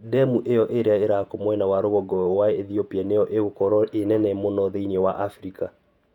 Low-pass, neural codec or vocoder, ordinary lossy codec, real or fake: 19.8 kHz; vocoder, 48 kHz, 128 mel bands, Vocos; none; fake